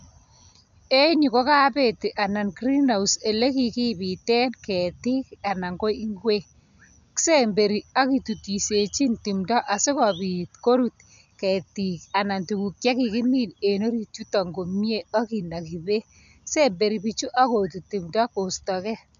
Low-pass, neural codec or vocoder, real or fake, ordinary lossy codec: 7.2 kHz; none; real; MP3, 96 kbps